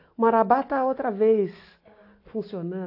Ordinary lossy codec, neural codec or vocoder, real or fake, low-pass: AAC, 32 kbps; none; real; 5.4 kHz